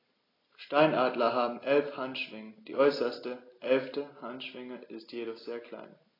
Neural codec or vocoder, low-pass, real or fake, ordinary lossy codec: none; 5.4 kHz; real; AAC, 24 kbps